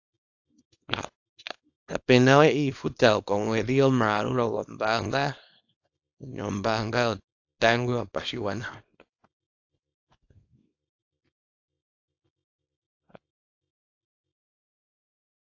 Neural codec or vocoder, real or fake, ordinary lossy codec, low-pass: codec, 24 kHz, 0.9 kbps, WavTokenizer, small release; fake; AAC, 48 kbps; 7.2 kHz